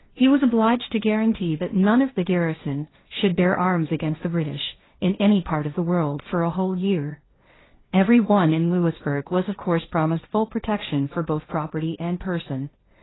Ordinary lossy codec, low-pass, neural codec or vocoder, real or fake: AAC, 16 kbps; 7.2 kHz; codec, 16 kHz, 1.1 kbps, Voila-Tokenizer; fake